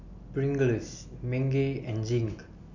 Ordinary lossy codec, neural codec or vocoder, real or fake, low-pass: none; none; real; 7.2 kHz